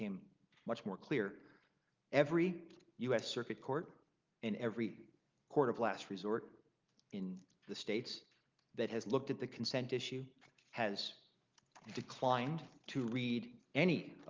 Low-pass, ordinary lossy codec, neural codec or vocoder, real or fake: 7.2 kHz; Opus, 24 kbps; none; real